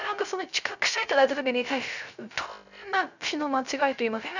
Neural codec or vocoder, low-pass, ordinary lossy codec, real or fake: codec, 16 kHz, 0.3 kbps, FocalCodec; 7.2 kHz; none; fake